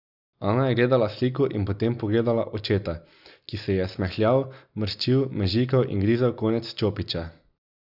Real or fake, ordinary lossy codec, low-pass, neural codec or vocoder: real; none; 5.4 kHz; none